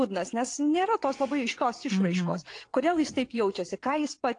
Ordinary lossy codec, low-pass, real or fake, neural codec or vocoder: AAC, 48 kbps; 9.9 kHz; fake; vocoder, 22.05 kHz, 80 mel bands, WaveNeXt